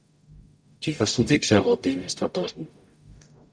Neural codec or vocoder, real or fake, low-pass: codec, 44.1 kHz, 0.9 kbps, DAC; fake; 9.9 kHz